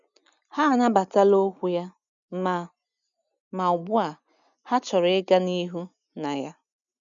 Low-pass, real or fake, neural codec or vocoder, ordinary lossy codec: 7.2 kHz; real; none; none